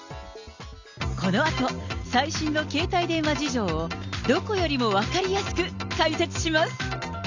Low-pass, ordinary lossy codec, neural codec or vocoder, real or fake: 7.2 kHz; Opus, 64 kbps; none; real